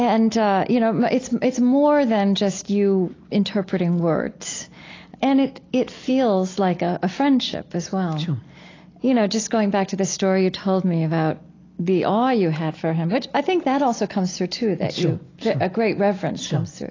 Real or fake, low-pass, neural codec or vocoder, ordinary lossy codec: real; 7.2 kHz; none; AAC, 32 kbps